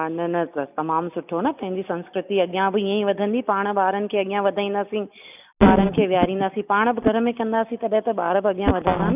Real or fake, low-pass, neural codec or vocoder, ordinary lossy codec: real; 3.6 kHz; none; none